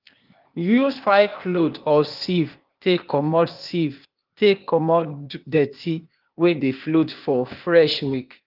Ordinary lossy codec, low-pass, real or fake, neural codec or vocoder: Opus, 24 kbps; 5.4 kHz; fake; codec, 16 kHz, 0.8 kbps, ZipCodec